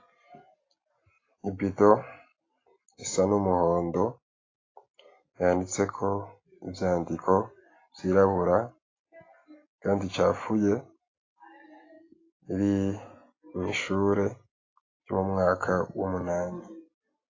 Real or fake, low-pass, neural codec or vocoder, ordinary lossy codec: real; 7.2 kHz; none; AAC, 32 kbps